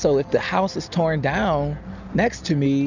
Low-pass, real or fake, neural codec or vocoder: 7.2 kHz; real; none